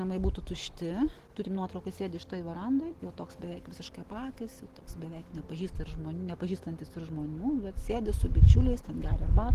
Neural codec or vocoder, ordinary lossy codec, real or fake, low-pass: none; Opus, 16 kbps; real; 14.4 kHz